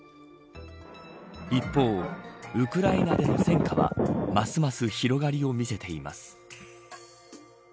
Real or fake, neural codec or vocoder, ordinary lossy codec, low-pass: real; none; none; none